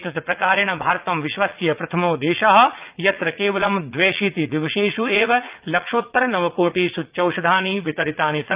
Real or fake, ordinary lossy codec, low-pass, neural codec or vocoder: fake; Opus, 32 kbps; 3.6 kHz; vocoder, 44.1 kHz, 80 mel bands, Vocos